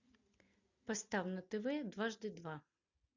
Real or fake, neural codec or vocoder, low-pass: real; none; 7.2 kHz